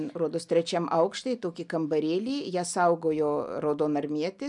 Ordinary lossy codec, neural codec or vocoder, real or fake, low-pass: MP3, 96 kbps; none; real; 10.8 kHz